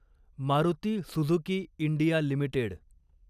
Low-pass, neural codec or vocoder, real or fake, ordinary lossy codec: 14.4 kHz; none; real; none